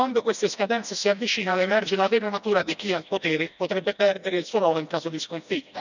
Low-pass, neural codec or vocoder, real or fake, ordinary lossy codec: 7.2 kHz; codec, 16 kHz, 1 kbps, FreqCodec, smaller model; fake; none